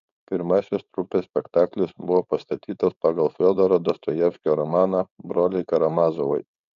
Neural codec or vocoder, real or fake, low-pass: codec, 16 kHz, 4.8 kbps, FACodec; fake; 7.2 kHz